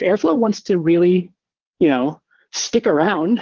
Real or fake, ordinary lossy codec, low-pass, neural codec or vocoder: fake; Opus, 16 kbps; 7.2 kHz; vocoder, 22.05 kHz, 80 mel bands, Vocos